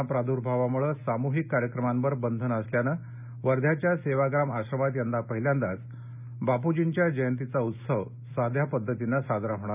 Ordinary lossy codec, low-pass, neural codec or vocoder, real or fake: none; 3.6 kHz; none; real